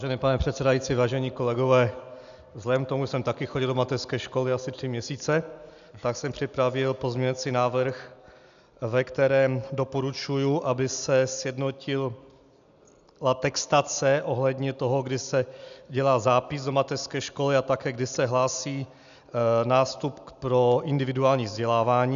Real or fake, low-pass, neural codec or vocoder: real; 7.2 kHz; none